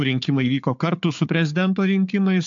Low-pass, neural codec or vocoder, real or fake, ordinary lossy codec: 7.2 kHz; codec, 16 kHz, 4 kbps, FunCodec, trained on Chinese and English, 50 frames a second; fake; AAC, 64 kbps